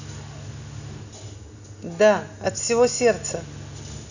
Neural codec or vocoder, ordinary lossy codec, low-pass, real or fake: none; none; 7.2 kHz; real